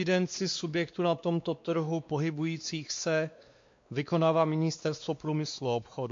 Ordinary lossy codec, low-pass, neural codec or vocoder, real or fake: MP3, 48 kbps; 7.2 kHz; codec, 16 kHz, 2 kbps, X-Codec, WavLM features, trained on Multilingual LibriSpeech; fake